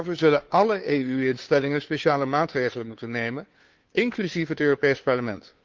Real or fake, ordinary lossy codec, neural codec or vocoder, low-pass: fake; Opus, 24 kbps; codec, 16 kHz, 2 kbps, FunCodec, trained on Chinese and English, 25 frames a second; 7.2 kHz